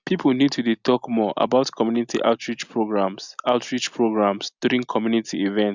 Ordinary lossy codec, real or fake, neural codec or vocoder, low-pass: Opus, 64 kbps; real; none; 7.2 kHz